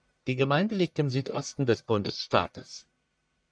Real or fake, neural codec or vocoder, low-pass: fake; codec, 44.1 kHz, 1.7 kbps, Pupu-Codec; 9.9 kHz